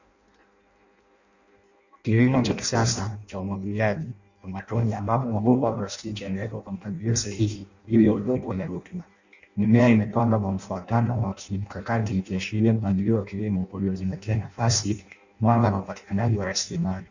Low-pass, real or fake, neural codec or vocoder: 7.2 kHz; fake; codec, 16 kHz in and 24 kHz out, 0.6 kbps, FireRedTTS-2 codec